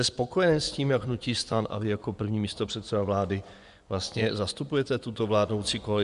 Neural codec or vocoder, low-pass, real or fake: vocoder, 24 kHz, 100 mel bands, Vocos; 10.8 kHz; fake